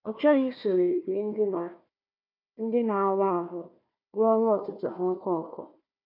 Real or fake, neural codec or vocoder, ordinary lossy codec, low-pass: fake; codec, 16 kHz, 1 kbps, FunCodec, trained on Chinese and English, 50 frames a second; MP3, 48 kbps; 5.4 kHz